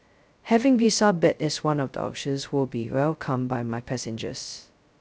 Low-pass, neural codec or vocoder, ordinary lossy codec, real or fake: none; codec, 16 kHz, 0.2 kbps, FocalCodec; none; fake